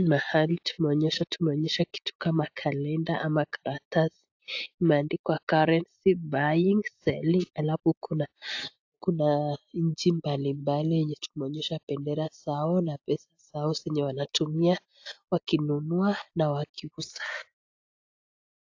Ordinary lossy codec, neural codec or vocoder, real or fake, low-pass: AAC, 48 kbps; none; real; 7.2 kHz